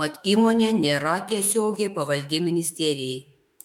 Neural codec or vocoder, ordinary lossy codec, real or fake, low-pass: autoencoder, 48 kHz, 32 numbers a frame, DAC-VAE, trained on Japanese speech; MP3, 96 kbps; fake; 19.8 kHz